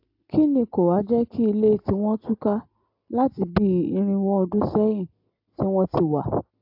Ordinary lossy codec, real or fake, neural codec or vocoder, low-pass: AAC, 48 kbps; real; none; 5.4 kHz